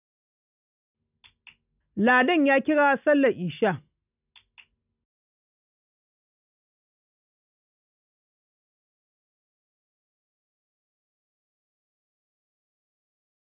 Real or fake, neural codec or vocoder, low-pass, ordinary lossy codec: real; none; 3.6 kHz; none